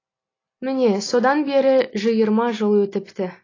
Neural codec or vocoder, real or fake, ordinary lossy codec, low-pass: none; real; AAC, 32 kbps; 7.2 kHz